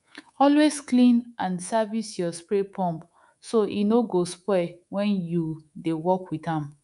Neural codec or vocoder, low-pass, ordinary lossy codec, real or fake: codec, 24 kHz, 3.1 kbps, DualCodec; 10.8 kHz; none; fake